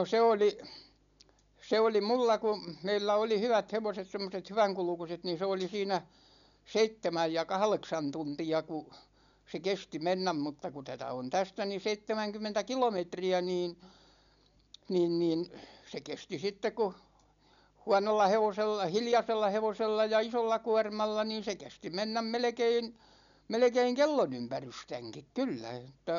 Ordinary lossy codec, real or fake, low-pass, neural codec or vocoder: none; real; 7.2 kHz; none